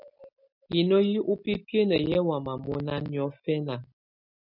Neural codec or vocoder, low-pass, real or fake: none; 5.4 kHz; real